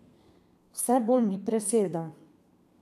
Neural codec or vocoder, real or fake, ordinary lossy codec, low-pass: codec, 32 kHz, 1.9 kbps, SNAC; fake; none; 14.4 kHz